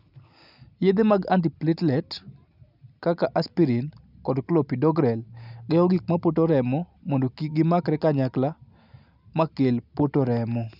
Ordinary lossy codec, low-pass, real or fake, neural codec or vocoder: none; 5.4 kHz; real; none